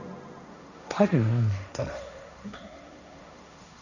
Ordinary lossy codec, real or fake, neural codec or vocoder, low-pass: none; fake; codec, 16 kHz, 1.1 kbps, Voila-Tokenizer; 7.2 kHz